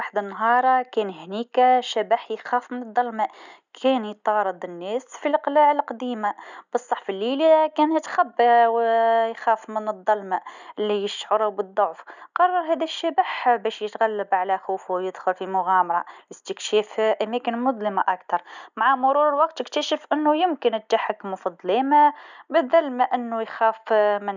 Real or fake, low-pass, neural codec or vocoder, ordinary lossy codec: real; 7.2 kHz; none; none